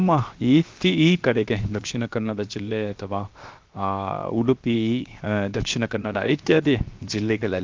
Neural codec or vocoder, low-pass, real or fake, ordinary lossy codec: codec, 16 kHz, 0.7 kbps, FocalCodec; 7.2 kHz; fake; Opus, 32 kbps